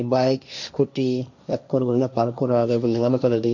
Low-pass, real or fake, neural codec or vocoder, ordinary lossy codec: 7.2 kHz; fake; codec, 16 kHz, 1.1 kbps, Voila-Tokenizer; none